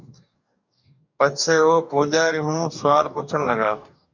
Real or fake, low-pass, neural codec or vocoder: fake; 7.2 kHz; codec, 44.1 kHz, 2.6 kbps, DAC